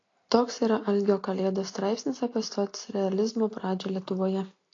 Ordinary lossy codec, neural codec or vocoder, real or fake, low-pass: AAC, 32 kbps; none; real; 7.2 kHz